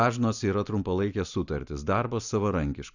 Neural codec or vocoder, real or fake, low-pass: none; real; 7.2 kHz